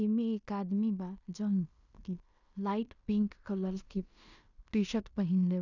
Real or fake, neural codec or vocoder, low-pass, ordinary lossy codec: fake; codec, 16 kHz in and 24 kHz out, 0.9 kbps, LongCat-Audio-Codec, fine tuned four codebook decoder; 7.2 kHz; none